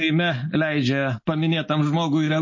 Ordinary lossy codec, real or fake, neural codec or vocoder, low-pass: MP3, 32 kbps; real; none; 7.2 kHz